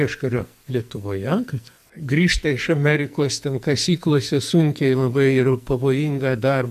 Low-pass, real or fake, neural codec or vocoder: 14.4 kHz; fake; codec, 44.1 kHz, 2.6 kbps, SNAC